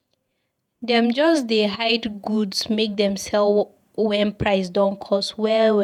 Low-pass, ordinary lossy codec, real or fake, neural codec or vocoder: 19.8 kHz; none; fake; vocoder, 48 kHz, 128 mel bands, Vocos